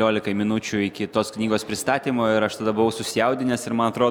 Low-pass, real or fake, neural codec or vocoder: 19.8 kHz; real; none